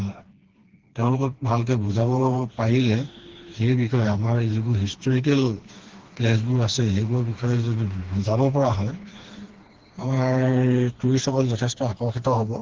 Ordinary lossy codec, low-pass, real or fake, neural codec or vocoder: Opus, 16 kbps; 7.2 kHz; fake; codec, 16 kHz, 2 kbps, FreqCodec, smaller model